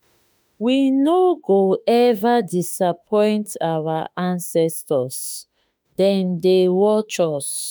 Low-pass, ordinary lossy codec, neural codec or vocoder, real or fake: none; none; autoencoder, 48 kHz, 32 numbers a frame, DAC-VAE, trained on Japanese speech; fake